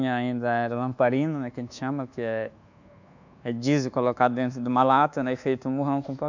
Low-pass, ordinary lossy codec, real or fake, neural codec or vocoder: 7.2 kHz; none; fake; codec, 24 kHz, 1.2 kbps, DualCodec